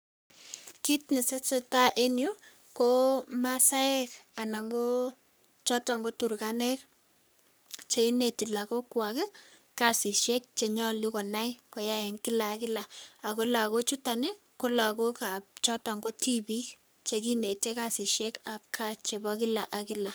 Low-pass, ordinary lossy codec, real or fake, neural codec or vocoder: none; none; fake; codec, 44.1 kHz, 3.4 kbps, Pupu-Codec